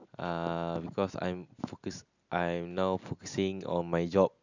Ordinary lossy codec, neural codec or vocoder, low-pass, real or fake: none; none; 7.2 kHz; real